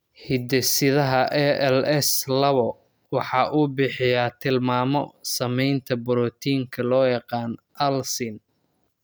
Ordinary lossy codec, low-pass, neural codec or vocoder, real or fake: none; none; none; real